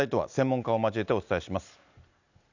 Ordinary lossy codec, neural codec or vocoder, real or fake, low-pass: none; none; real; 7.2 kHz